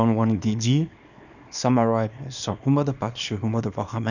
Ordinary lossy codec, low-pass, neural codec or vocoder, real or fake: none; 7.2 kHz; codec, 24 kHz, 0.9 kbps, WavTokenizer, small release; fake